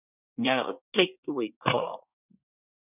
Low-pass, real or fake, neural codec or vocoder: 3.6 kHz; fake; codec, 24 kHz, 1 kbps, SNAC